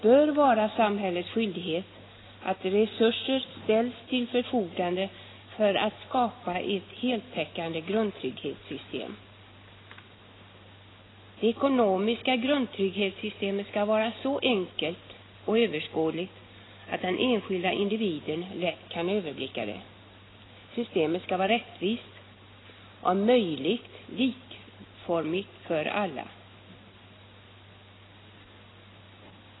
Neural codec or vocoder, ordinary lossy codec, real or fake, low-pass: none; AAC, 16 kbps; real; 7.2 kHz